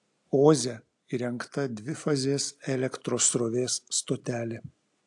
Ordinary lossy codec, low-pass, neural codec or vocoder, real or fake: AAC, 64 kbps; 10.8 kHz; none; real